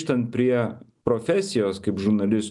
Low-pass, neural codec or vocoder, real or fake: 10.8 kHz; none; real